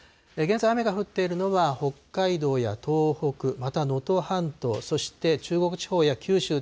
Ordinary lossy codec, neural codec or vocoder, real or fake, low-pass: none; none; real; none